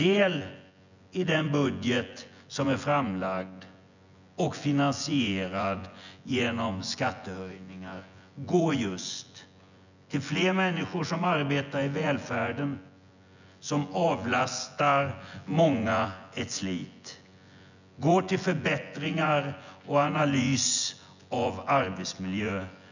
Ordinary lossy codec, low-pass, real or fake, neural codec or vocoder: none; 7.2 kHz; fake; vocoder, 24 kHz, 100 mel bands, Vocos